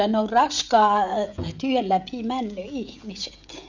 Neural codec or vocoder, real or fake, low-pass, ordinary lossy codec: codec, 16 kHz, 16 kbps, FreqCodec, smaller model; fake; 7.2 kHz; none